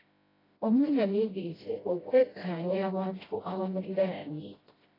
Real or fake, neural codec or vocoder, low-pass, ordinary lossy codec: fake; codec, 16 kHz, 0.5 kbps, FreqCodec, smaller model; 5.4 kHz; AAC, 24 kbps